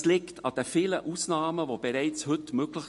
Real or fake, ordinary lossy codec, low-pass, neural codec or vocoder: real; MP3, 48 kbps; 14.4 kHz; none